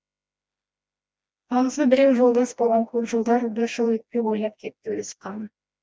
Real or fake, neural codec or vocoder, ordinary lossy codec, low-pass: fake; codec, 16 kHz, 1 kbps, FreqCodec, smaller model; none; none